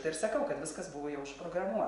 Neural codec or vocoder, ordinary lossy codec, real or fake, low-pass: vocoder, 24 kHz, 100 mel bands, Vocos; Opus, 64 kbps; fake; 10.8 kHz